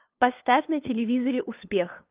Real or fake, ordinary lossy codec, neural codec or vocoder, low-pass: fake; Opus, 32 kbps; codec, 16 kHz, 2 kbps, FunCodec, trained on LibriTTS, 25 frames a second; 3.6 kHz